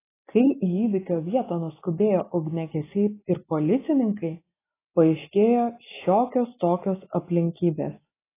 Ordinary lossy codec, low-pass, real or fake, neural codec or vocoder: AAC, 16 kbps; 3.6 kHz; real; none